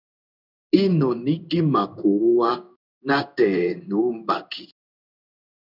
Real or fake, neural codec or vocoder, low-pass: fake; codec, 16 kHz in and 24 kHz out, 1 kbps, XY-Tokenizer; 5.4 kHz